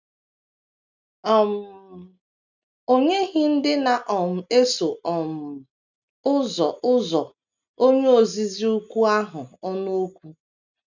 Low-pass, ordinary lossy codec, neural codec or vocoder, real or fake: 7.2 kHz; none; none; real